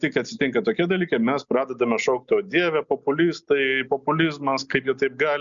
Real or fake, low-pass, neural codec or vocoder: real; 7.2 kHz; none